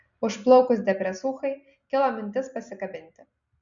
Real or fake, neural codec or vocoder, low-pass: real; none; 7.2 kHz